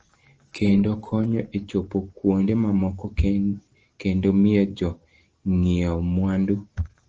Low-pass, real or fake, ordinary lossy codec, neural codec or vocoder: 7.2 kHz; real; Opus, 16 kbps; none